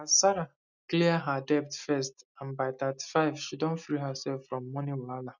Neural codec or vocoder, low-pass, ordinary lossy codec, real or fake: none; 7.2 kHz; none; real